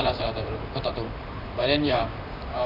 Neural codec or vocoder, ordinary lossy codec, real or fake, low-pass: vocoder, 44.1 kHz, 128 mel bands, Pupu-Vocoder; none; fake; 5.4 kHz